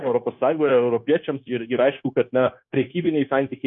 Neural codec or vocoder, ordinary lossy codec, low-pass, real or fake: codec, 16 kHz, 0.9 kbps, LongCat-Audio-Codec; MP3, 64 kbps; 7.2 kHz; fake